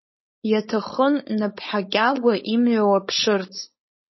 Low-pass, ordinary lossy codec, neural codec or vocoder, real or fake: 7.2 kHz; MP3, 24 kbps; codec, 16 kHz, 4.8 kbps, FACodec; fake